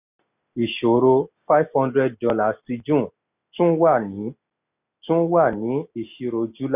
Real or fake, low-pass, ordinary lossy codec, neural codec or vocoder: real; 3.6 kHz; none; none